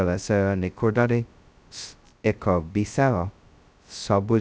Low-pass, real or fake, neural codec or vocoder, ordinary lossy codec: none; fake; codec, 16 kHz, 0.2 kbps, FocalCodec; none